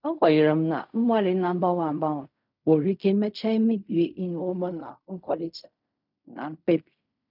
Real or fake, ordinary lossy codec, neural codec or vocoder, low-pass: fake; none; codec, 16 kHz in and 24 kHz out, 0.4 kbps, LongCat-Audio-Codec, fine tuned four codebook decoder; 5.4 kHz